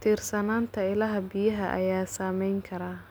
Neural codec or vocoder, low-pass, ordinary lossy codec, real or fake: none; none; none; real